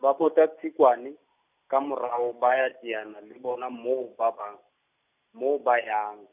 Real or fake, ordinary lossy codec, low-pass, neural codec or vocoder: real; none; 3.6 kHz; none